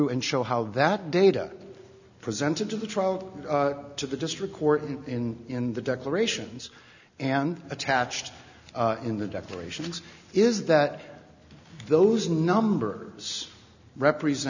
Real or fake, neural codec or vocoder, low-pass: real; none; 7.2 kHz